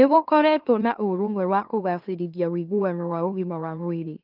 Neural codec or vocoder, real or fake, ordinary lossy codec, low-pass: autoencoder, 44.1 kHz, a latent of 192 numbers a frame, MeloTTS; fake; Opus, 32 kbps; 5.4 kHz